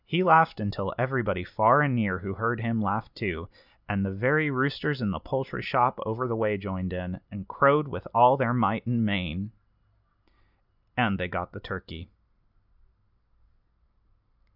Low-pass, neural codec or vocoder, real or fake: 5.4 kHz; none; real